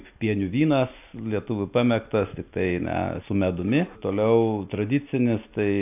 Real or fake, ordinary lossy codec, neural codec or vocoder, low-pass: real; AAC, 32 kbps; none; 3.6 kHz